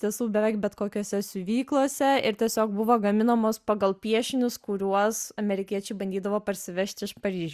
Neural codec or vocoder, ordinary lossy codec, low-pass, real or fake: none; Opus, 64 kbps; 14.4 kHz; real